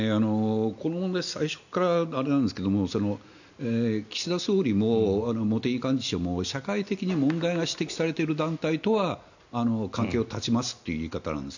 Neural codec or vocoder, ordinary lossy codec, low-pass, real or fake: none; none; 7.2 kHz; real